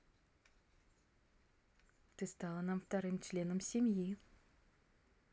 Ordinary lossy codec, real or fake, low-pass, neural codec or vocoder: none; real; none; none